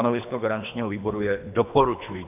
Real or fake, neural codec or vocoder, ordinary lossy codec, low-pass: fake; codec, 24 kHz, 3 kbps, HILCodec; AAC, 32 kbps; 3.6 kHz